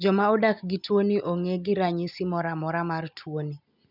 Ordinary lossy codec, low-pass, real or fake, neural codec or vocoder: none; 5.4 kHz; real; none